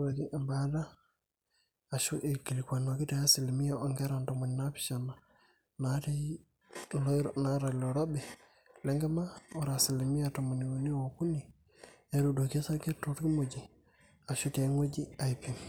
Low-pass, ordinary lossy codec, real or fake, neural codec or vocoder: none; none; real; none